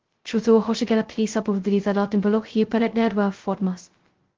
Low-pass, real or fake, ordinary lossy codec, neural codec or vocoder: 7.2 kHz; fake; Opus, 16 kbps; codec, 16 kHz, 0.2 kbps, FocalCodec